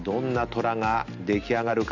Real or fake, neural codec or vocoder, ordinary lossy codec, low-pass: real; none; none; 7.2 kHz